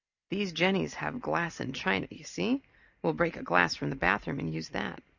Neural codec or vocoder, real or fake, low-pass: none; real; 7.2 kHz